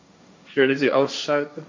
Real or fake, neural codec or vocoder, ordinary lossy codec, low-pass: fake; codec, 16 kHz, 1.1 kbps, Voila-Tokenizer; MP3, 64 kbps; 7.2 kHz